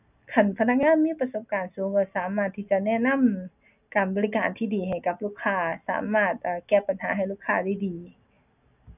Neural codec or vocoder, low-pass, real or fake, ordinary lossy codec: none; 3.6 kHz; real; none